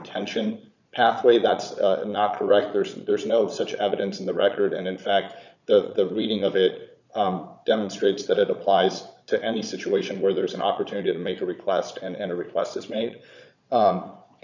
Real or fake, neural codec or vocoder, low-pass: fake; vocoder, 22.05 kHz, 80 mel bands, Vocos; 7.2 kHz